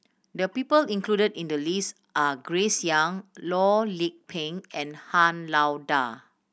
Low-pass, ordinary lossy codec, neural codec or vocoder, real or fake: none; none; none; real